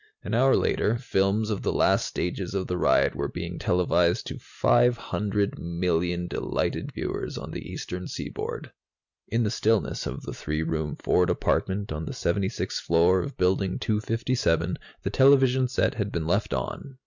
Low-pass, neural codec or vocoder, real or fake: 7.2 kHz; none; real